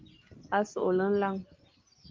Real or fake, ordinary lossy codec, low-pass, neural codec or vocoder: real; Opus, 32 kbps; 7.2 kHz; none